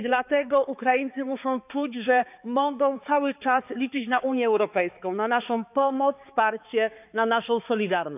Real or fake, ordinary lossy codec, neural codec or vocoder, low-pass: fake; none; codec, 16 kHz, 4 kbps, X-Codec, HuBERT features, trained on balanced general audio; 3.6 kHz